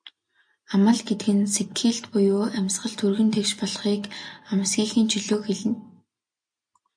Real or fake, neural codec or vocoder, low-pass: real; none; 9.9 kHz